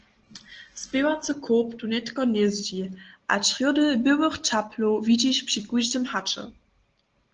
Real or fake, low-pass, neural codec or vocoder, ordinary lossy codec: real; 7.2 kHz; none; Opus, 16 kbps